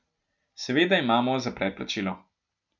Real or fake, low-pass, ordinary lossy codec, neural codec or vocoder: real; 7.2 kHz; none; none